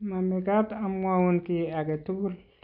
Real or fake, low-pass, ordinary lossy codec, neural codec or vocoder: real; 5.4 kHz; none; none